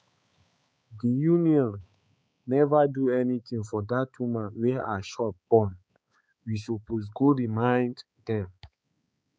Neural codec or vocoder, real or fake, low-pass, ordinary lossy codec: codec, 16 kHz, 4 kbps, X-Codec, HuBERT features, trained on balanced general audio; fake; none; none